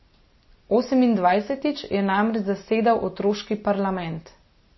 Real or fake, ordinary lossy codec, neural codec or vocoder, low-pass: real; MP3, 24 kbps; none; 7.2 kHz